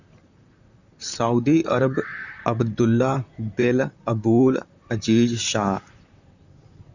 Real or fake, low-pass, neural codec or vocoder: fake; 7.2 kHz; vocoder, 44.1 kHz, 128 mel bands, Pupu-Vocoder